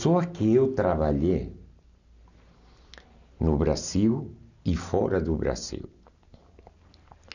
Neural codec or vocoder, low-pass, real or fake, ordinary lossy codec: none; 7.2 kHz; real; none